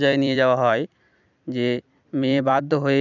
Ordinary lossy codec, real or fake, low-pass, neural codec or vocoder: none; fake; 7.2 kHz; vocoder, 44.1 kHz, 128 mel bands every 256 samples, BigVGAN v2